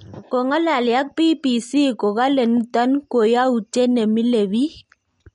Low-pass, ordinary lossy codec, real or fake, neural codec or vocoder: 10.8 kHz; MP3, 48 kbps; real; none